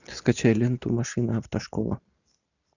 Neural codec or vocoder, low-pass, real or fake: none; 7.2 kHz; real